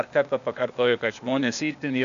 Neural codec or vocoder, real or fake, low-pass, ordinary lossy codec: codec, 16 kHz, 0.8 kbps, ZipCodec; fake; 7.2 kHz; AAC, 96 kbps